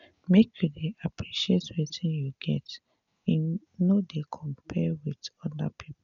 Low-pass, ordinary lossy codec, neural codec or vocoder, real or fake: 7.2 kHz; none; none; real